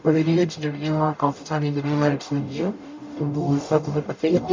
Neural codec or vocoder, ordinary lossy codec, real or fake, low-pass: codec, 44.1 kHz, 0.9 kbps, DAC; MP3, 64 kbps; fake; 7.2 kHz